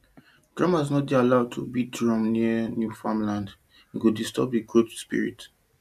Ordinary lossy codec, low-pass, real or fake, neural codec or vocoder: AAC, 96 kbps; 14.4 kHz; real; none